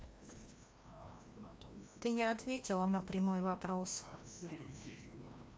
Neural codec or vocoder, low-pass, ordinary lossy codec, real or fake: codec, 16 kHz, 1 kbps, FreqCodec, larger model; none; none; fake